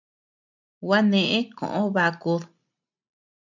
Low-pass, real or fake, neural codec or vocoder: 7.2 kHz; real; none